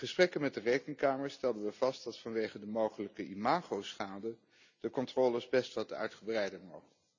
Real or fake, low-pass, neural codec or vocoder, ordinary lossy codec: real; 7.2 kHz; none; none